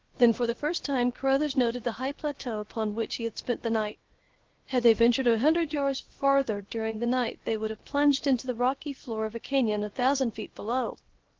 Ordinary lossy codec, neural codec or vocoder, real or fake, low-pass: Opus, 16 kbps; codec, 16 kHz, 0.7 kbps, FocalCodec; fake; 7.2 kHz